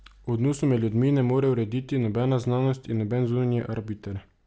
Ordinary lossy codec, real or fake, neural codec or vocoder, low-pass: none; real; none; none